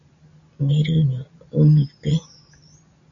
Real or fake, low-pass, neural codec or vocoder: real; 7.2 kHz; none